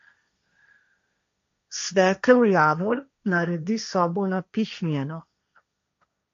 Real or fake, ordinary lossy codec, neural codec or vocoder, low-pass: fake; MP3, 48 kbps; codec, 16 kHz, 1.1 kbps, Voila-Tokenizer; 7.2 kHz